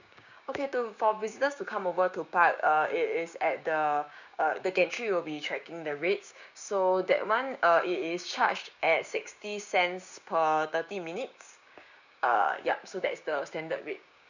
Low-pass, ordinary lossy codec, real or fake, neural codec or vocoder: 7.2 kHz; none; fake; codec, 16 kHz, 6 kbps, DAC